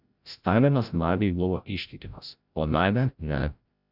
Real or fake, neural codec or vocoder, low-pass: fake; codec, 16 kHz, 0.5 kbps, FreqCodec, larger model; 5.4 kHz